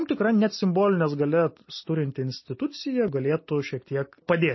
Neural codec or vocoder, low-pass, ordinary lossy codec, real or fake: none; 7.2 kHz; MP3, 24 kbps; real